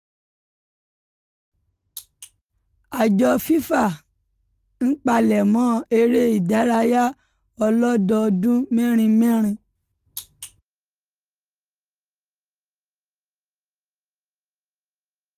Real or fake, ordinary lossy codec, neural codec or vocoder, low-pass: real; Opus, 24 kbps; none; 14.4 kHz